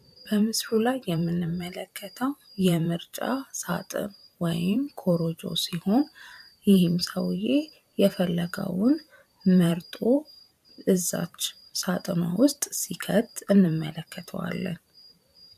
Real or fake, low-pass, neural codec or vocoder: fake; 14.4 kHz; vocoder, 44.1 kHz, 128 mel bands every 512 samples, BigVGAN v2